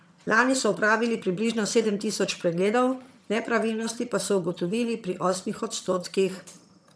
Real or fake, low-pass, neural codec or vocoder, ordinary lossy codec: fake; none; vocoder, 22.05 kHz, 80 mel bands, HiFi-GAN; none